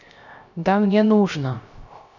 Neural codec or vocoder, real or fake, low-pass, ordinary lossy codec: codec, 16 kHz, 0.3 kbps, FocalCodec; fake; 7.2 kHz; none